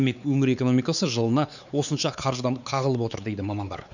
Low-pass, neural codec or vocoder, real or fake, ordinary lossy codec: 7.2 kHz; codec, 16 kHz, 4 kbps, X-Codec, WavLM features, trained on Multilingual LibriSpeech; fake; none